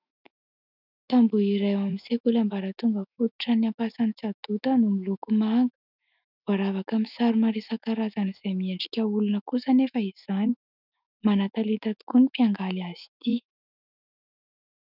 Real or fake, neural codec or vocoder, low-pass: fake; autoencoder, 48 kHz, 128 numbers a frame, DAC-VAE, trained on Japanese speech; 5.4 kHz